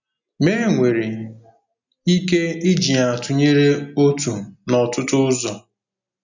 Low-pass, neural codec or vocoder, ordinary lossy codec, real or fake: 7.2 kHz; none; none; real